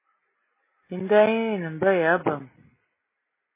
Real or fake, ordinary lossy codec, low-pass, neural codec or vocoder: real; MP3, 16 kbps; 3.6 kHz; none